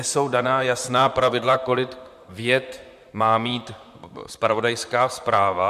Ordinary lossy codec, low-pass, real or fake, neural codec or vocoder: MP3, 96 kbps; 14.4 kHz; fake; vocoder, 44.1 kHz, 128 mel bands, Pupu-Vocoder